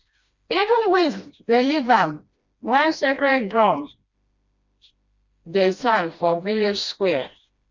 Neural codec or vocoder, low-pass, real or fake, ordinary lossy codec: codec, 16 kHz, 1 kbps, FreqCodec, smaller model; 7.2 kHz; fake; Opus, 64 kbps